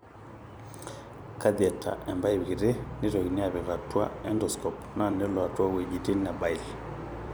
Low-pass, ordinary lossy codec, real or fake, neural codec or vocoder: none; none; fake; vocoder, 44.1 kHz, 128 mel bands every 256 samples, BigVGAN v2